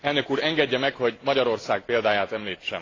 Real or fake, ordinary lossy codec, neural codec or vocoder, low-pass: real; AAC, 32 kbps; none; 7.2 kHz